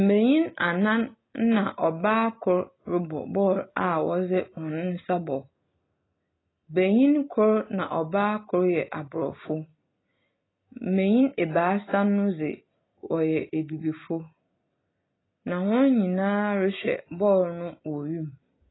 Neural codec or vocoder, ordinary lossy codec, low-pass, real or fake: none; AAC, 16 kbps; 7.2 kHz; real